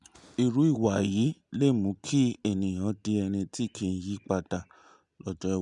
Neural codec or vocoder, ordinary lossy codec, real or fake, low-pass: none; none; real; 10.8 kHz